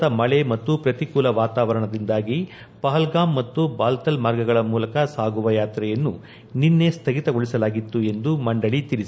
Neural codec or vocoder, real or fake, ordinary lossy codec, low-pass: none; real; none; none